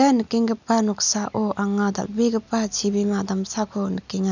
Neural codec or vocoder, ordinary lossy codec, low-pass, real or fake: none; none; 7.2 kHz; real